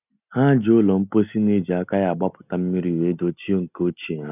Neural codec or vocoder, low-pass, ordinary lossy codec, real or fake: none; 3.6 kHz; none; real